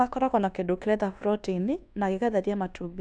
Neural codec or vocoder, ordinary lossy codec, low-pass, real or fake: codec, 24 kHz, 1.2 kbps, DualCodec; none; 9.9 kHz; fake